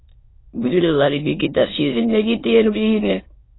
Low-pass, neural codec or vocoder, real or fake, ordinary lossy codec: 7.2 kHz; autoencoder, 22.05 kHz, a latent of 192 numbers a frame, VITS, trained on many speakers; fake; AAC, 16 kbps